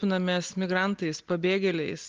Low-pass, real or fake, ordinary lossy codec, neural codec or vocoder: 7.2 kHz; real; Opus, 16 kbps; none